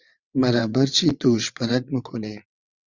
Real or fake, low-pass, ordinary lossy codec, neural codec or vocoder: fake; 7.2 kHz; Opus, 64 kbps; vocoder, 22.05 kHz, 80 mel bands, WaveNeXt